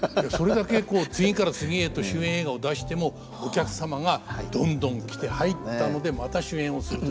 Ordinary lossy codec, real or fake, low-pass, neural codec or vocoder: none; real; none; none